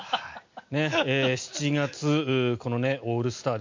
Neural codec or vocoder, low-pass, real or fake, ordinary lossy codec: none; 7.2 kHz; real; MP3, 64 kbps